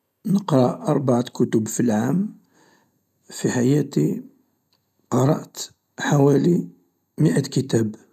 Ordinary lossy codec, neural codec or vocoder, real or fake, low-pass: none; none; real; 14.4 kHz